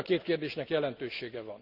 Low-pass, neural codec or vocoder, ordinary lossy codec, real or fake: 5.4 kHz; none; none; real